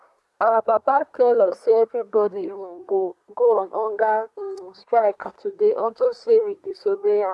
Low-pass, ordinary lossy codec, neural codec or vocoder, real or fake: none; none; codec, 24 kHz, 1 kbps, SNAC; fake